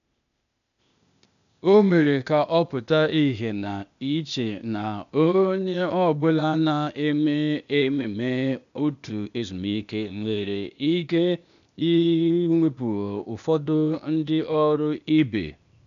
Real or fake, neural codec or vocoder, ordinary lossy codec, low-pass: fake; codec, 16 kHz, 0.8 kbps, ZipCodec; none; 7.2 kHz